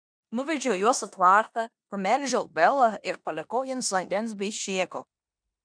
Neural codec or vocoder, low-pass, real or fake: codec, 16 kHz in and 24 kHz out, 0.9 kbps, LongCat-Audio-Codec, four codebook decoder; 9.9 kHz; fake